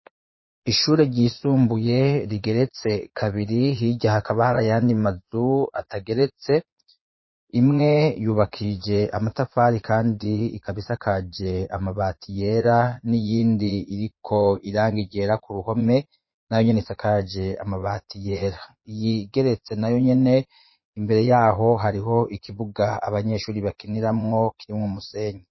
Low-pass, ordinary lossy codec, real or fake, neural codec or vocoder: 7.2 kHz; MP3, 24 kbps; fake; vocoder, 22.05 kHz, 80 mel bands, Vocos